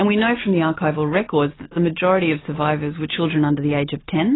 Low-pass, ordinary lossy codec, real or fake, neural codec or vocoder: 7.2 kHz; AAC, 16 kbps; real; none